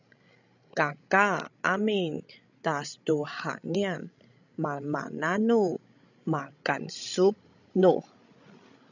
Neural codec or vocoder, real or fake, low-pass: codec, 16 kHz, 16 kbps, FreqCodec, larger model; fake; 7.2 kHz